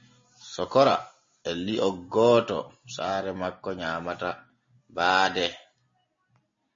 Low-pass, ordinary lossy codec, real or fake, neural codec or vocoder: 7.2 kHz; MP3, 32 kbps; real; none